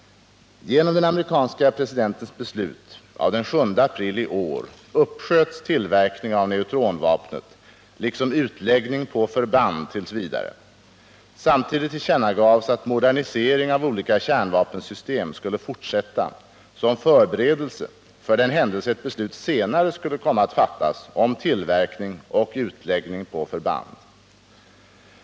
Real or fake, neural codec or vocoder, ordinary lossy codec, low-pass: real; none; none; none